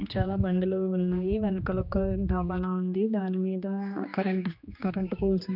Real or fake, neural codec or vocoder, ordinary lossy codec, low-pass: fake; codec, 16 kHz, 2 kbps, X-Codec, HuBERT features, trained on general audio; none; 5.4 kHz